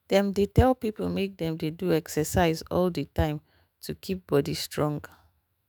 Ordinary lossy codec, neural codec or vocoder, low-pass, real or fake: none; autoencoder, 48 kHz, 128 numbers a frame, DAC-VAE, trained on Japanese speech; none; fake